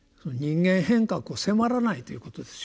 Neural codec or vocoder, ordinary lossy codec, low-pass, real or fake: none; none; none; real